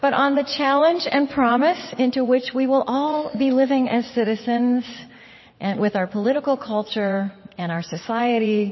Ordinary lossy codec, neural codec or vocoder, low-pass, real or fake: MP3, 24 kbps; vocoder, 22.05 kHz, 80 mel bands, WaveNeXt; 7.2 kHz; fake